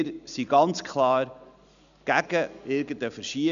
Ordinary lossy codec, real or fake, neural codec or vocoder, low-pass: none; real; none; 7.2 kHz